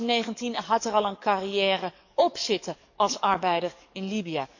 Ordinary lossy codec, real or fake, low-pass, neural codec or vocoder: none; fake; 7.2 kHz; codec, 44.1 kHz, 7.8 kbps, DAC